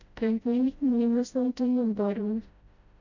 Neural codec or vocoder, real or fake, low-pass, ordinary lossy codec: codec, 16 kHz, 0.5 kbps, FreqCodec, smaller model; fake; 7.2 kHz; none